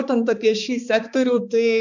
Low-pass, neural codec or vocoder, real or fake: 7.2 kHz; codec, 16 kHz, 2 kbps, X-Codec, HuBERT features, trained on balanced general audio; fake